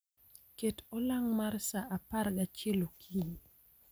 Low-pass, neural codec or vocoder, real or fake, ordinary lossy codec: none; none; real; none